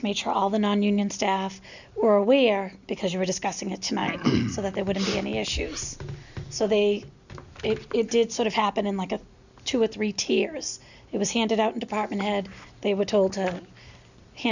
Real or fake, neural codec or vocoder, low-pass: real; none; 7.2 kHz